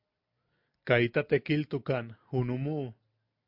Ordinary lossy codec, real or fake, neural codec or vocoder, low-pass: MP3, 48 kbps; real; none; 5.4 kHz